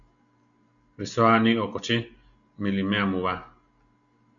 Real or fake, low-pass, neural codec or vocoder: real; 7.2 kHz; none